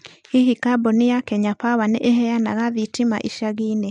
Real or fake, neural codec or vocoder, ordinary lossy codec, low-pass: real; none; MP3, 64 kbps; 10.8 kHz